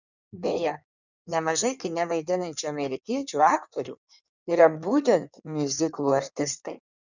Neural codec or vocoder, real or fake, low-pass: codec, 16 kHz in and 24 kHz out, 1.1 kbps, FireRedTTS-2 codec; fake; 7.2 kHz